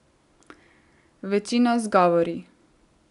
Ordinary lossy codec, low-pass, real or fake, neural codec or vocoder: none; 10.8 kHz; real; none